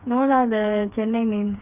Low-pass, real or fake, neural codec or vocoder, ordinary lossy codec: 3.6 kHz; fake; codec, 16 kHz, 4 kbps, FreqCodec, smaller model; none